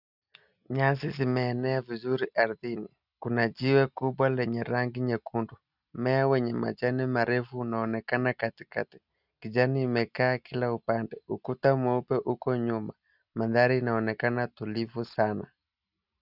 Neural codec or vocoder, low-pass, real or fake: none; 5.4 kHz; real